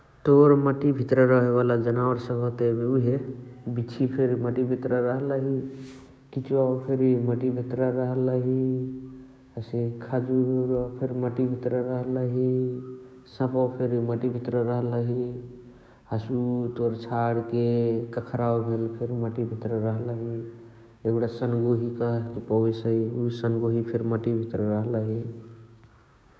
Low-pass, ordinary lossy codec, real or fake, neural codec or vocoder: none; none; fake; codec, 16 kHz, 6 kbps, DAC